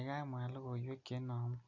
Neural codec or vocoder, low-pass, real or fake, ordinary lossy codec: none; 7.2 kHz; real; none